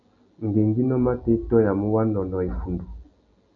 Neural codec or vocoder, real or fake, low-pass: none; real; 7.2 kHz